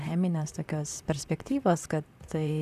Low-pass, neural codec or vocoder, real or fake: 14.4 kHz; vocoder, 44.1 kHz, 128 mel bands, Pupu-Vocoder; fake